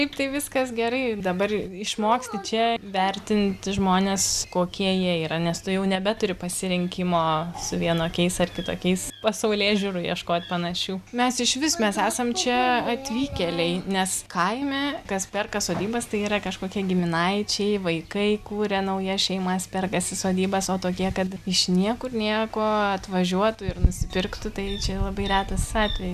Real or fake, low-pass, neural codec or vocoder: real; 14.4 kHz; none